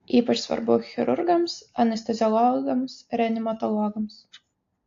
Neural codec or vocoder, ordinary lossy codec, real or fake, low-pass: none; AAC, 48 kbps; real; 7.2 kHz